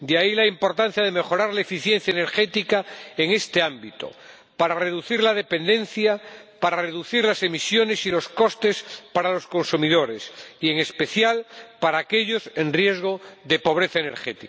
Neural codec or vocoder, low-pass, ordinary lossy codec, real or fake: none; none; none; real